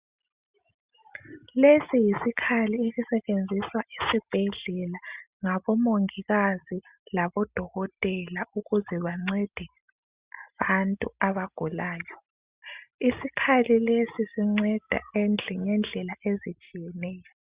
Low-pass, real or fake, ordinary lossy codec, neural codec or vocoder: 3.6 kHz; real; Opus, 64 kbps; none